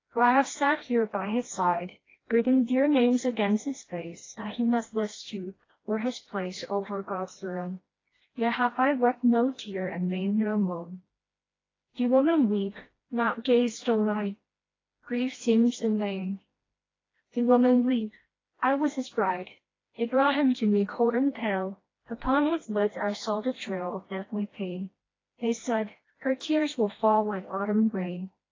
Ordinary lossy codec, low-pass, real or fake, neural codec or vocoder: AAC, 32 kbps; 7.2 kHz; fake; codec, 16 kHz, 1 kbps, FreqCodec, smaller model